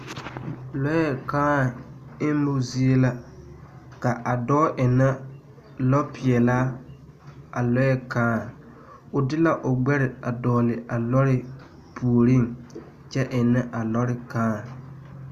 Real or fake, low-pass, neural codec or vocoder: real; 14.4 kHz; none